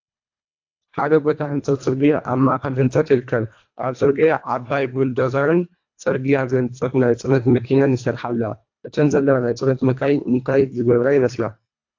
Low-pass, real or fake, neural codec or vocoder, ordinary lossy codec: 7.2 kHz; fake; codec, 24 kHz, 1.5 kbps, HILCodec; AAC, 48 kbps